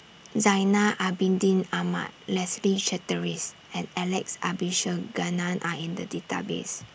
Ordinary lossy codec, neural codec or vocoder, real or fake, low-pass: none; none; real; none